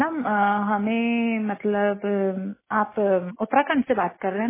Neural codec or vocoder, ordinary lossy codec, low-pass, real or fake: none; MP3, 16 kbps; 3.6 kHz; real